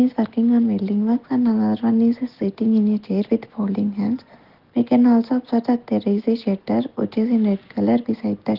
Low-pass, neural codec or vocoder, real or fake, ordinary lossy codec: 5.4 kHz; none; real; Opus, 16 kbps